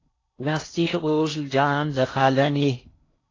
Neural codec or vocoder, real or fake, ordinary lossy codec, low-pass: codec, 16 kHz in and 24 kHz out, 0.6 kbps, FocalCodec, streaming, 4096 codes; fake; AAC, 32 kbps; 7.2 kHz